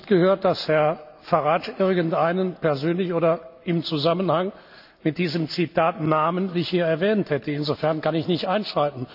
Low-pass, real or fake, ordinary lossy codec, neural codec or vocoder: 5.4 kHz; real; none; none